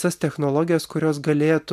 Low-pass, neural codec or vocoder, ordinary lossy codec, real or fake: 14.4 kHz; none; MP3, 96 kbps; real